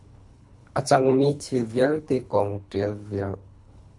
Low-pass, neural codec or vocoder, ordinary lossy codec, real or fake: 10.8 kHz; codec, 24 kHz, 3 kbps, HILCodec; MP3, 64 kbps; fake